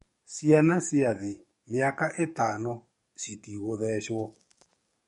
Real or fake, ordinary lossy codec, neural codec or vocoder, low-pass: fake; MP3, 48 kbps; codec, 44.1 kHz, 7.8 kbps, DAC; 19.8 kHz